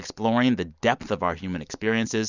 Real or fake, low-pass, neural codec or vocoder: real; 7.2 kHz; none